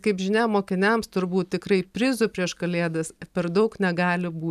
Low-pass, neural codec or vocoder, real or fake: 14.4 kHz; none; real